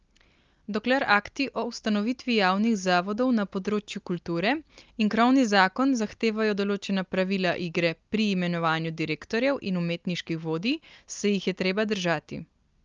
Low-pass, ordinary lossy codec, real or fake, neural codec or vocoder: 7.2 kHz; Opus, 24 kbps; real; none